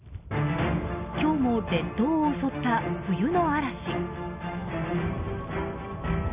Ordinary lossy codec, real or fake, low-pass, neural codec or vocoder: Opus, 64 kbps; real; 3.6 kHz; none